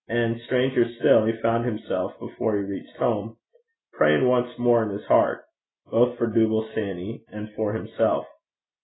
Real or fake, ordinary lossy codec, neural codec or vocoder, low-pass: real; AAC, 16 kbps; none; 7.2 kHz